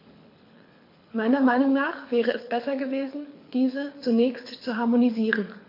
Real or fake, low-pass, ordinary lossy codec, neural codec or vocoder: fake; 5.4 kHz; MP3, 32 kbps; codec, 24 kHz, 6 kbps, HILCodec